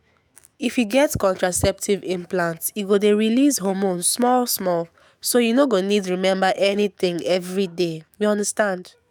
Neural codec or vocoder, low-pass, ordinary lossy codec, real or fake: autoencoder, 48 kHz, 128 numbers a frame, DAC-VAE, trained on Japanese speech; none; none; fake